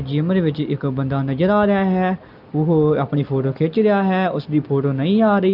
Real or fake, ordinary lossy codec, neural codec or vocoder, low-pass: real; Opus, 24 kbps; none; 5.4 kHz